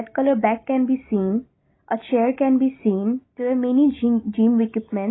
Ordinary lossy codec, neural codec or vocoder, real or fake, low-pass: AAC, 16 kbps; none; real; 7.2 kHz